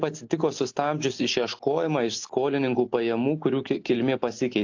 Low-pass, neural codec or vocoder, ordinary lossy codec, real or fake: 7.2 kHz; none; AAC, 48 kbps; real